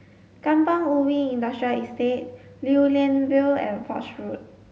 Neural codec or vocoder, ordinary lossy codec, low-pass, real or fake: none; none; none; real